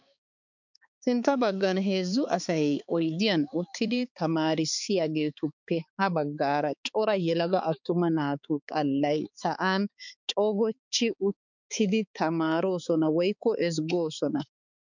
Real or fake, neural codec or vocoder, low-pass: fake; codec, 16 kHz, 4 kbps, X-Codec, HuBERT features, trained on balanced general audio; 7.2 kHz